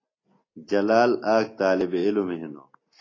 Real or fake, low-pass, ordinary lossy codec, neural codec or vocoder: real; 7.2 kHz; AAC, 32 kbps; none